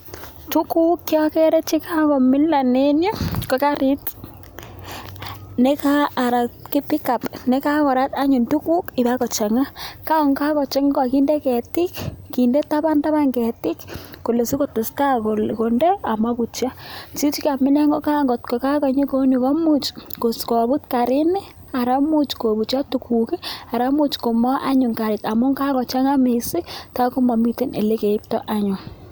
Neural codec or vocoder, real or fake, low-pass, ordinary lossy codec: none; real; none; none